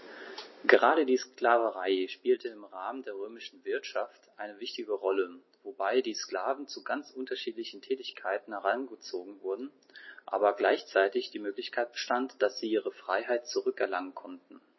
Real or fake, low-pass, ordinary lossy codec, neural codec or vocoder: real; 7.2 kHz; MP3, 24 kbps; none